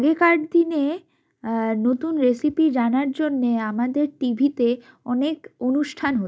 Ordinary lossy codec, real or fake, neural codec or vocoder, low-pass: none; real; none; none